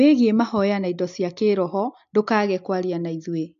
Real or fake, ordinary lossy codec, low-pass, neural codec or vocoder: real; AAC, 64 kbps; 7.2 kHz; none